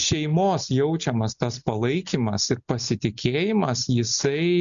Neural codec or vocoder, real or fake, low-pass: none; real; 7.2 kHz